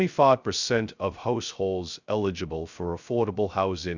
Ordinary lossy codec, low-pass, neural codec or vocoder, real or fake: Opus, 64 kbps; 7.2 kHz; codec, 16 kHz, 0.2 kbps, FocalCodec; fake